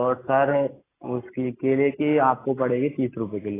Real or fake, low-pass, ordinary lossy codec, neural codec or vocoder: real; 3.6 kHz; AAC, 16 kbps; none